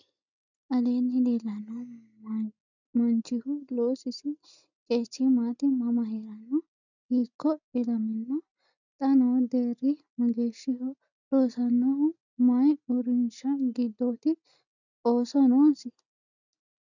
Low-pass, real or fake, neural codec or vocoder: 7.2 kHz; real; none